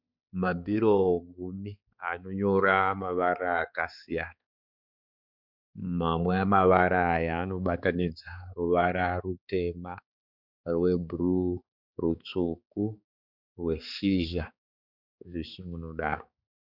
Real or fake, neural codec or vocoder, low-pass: fake; codec, 16 kHz, 4 kbps, X-Codec, HuBERT features, trained on balanced general audio; 5.4 kHz